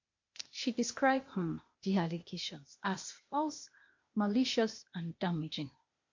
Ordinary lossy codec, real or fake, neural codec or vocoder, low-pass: MP3, 48 kbps; fake; codec, 16 kHz, 0.8 kbps, ZipCodec; 7.2 kHz